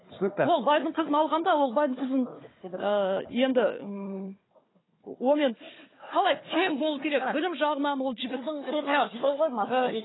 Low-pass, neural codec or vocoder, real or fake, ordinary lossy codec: 7.2 kHz; codec, 16 kHz, 4 kbps, FunCodec, trained on Chinese and English, 50 frames a second; fake; AAC, 16 kbps